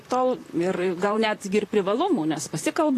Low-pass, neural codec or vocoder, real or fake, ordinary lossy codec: 14.4 kHz; vocoder, 44.1 kHz, 128 mel bands, Pupu-Vocoder; fake; AAC, 48 kbps